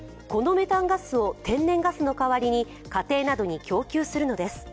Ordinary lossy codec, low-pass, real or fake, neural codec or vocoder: none; none; real; none